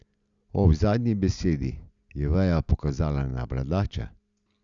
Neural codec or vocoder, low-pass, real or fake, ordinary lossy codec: none; 7.2 kHz; real; Opus, 64 kbps